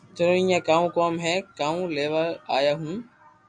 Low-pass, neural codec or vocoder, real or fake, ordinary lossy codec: 9.9 kHz; none; real; MP3, 96 kbps